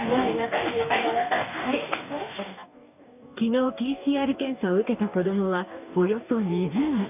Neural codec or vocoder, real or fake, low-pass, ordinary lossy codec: codec, 44.1 kHz, 2.6 kbps, DAC; fake; 3.6 kHz; none